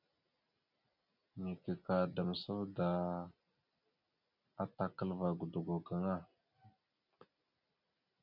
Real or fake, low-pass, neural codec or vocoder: real; 5.4 kHz; none